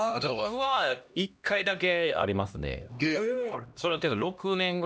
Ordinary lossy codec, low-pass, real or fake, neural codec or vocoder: none; none; fake; codec, 16 kHz, 1 kbps, X-Codec, HuBERT features, trained on LibriSpeech